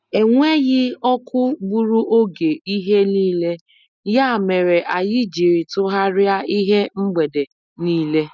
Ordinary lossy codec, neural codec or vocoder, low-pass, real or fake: none; none; 7.2 kHz; real